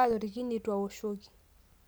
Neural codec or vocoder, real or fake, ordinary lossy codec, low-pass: none; real; none; none